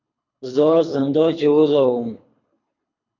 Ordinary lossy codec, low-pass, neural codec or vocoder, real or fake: AAC, 32 kbps; 7.2 kHz; codec, 24 kHz, 3 kbps, HILCodec; fake